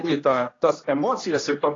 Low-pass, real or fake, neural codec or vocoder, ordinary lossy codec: 7.2 kHz; fake; codec, 16 kHz, 1 kbps, X-Codec, HuBERT features, trained on general audio; AAC, 32 kbps